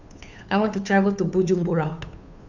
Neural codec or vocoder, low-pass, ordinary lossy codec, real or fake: codec, 16 kHz, 2 kbps, FunCodec, trained on Chinese and English, 25 frames a second; 7.2 kHz; none; fake